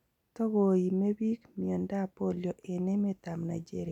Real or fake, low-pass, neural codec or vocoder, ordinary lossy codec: real; 19.8 kHz; none; none